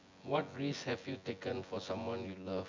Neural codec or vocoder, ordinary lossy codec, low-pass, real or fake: vocoder, 24 kHz, 100 mel bands, Vocos; MP3, 64 kbps; 7.2 kHz; fake